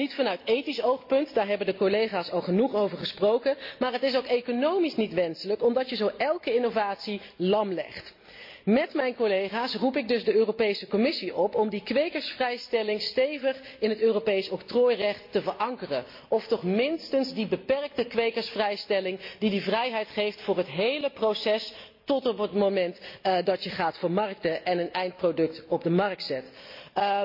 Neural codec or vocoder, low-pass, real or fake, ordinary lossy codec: none; 5.4 kHz; real; MP3, 32 kbps